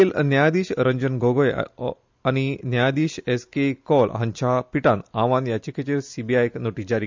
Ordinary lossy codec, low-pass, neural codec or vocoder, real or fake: MP3, 64 kbps; 7.2 kHz; none; real